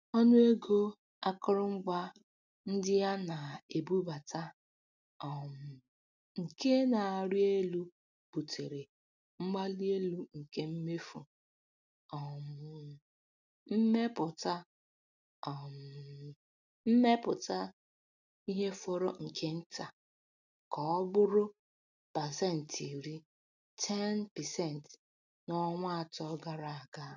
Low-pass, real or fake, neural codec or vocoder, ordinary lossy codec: 7.2 kHz; real; none; none